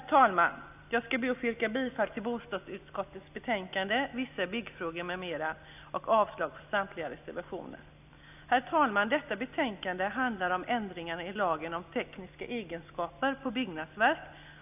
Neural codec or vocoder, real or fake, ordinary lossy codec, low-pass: none; real; none; 3.6 kHz